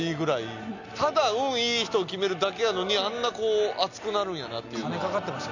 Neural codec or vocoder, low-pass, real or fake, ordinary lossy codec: none; 7.2 kHz; real; none